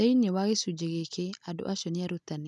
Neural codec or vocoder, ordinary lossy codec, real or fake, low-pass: none; none; real; none